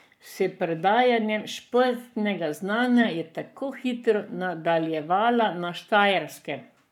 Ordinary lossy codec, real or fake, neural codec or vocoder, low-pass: none; fake; codec, 44.1 kHz, 7.8 kbps, Pupu-Codec; 19.8 kHz